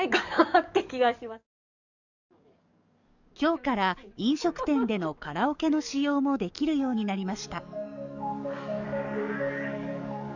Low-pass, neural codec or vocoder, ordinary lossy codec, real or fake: 7.2 kHz; codec, 44.1 kHz, 7.8 kbps, DAC; none; fake